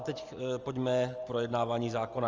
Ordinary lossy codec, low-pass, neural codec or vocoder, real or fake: Opus, 24 kbps; 7.2 kHz; none; real